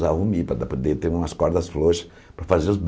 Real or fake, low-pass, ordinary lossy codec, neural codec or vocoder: real; none; none; none